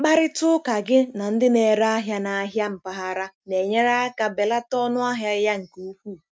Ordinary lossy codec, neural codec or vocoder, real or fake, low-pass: none; none; real; none